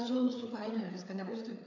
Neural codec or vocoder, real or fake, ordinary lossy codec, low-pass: codec, 16 kHz, 4 kbps, FreqCodec, larger model; fake; AAC, 48 kbps; 7.2 kHz